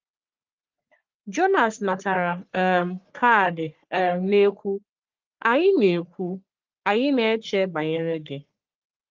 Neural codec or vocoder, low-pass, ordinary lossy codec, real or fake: codec, 44.1 kHz, 3.4 kbps, Pupu-Codec; 7.2 kHz; Opus, 32 kbps; fake